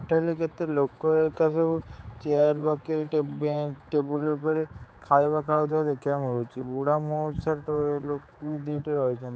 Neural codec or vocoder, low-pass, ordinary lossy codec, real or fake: codec, 16 kHz, 4 kbps, X-Codec, HuBERT features, trained on general audio; none; none; fake